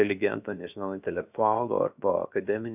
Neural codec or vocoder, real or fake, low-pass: codec, 16 kHz, 0.7 kbps, FocalCodec; fake; 3.6 kHz